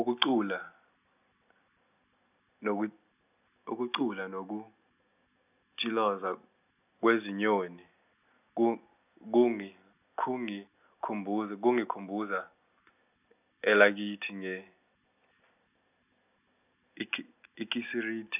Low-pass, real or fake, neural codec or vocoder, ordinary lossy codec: 3.6 kHz; real; none; none